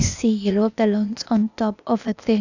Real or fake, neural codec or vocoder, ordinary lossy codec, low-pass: fake; codec, 16 kHz, 0.8 kbps, ZipCodec; none; 7.2 kHz